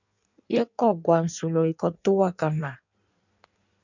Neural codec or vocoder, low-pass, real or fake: codec, 16 kHz in and 24 kHz out, 1.1 kbps, FireRedTTS-2 codec; 7.2 kHz; fake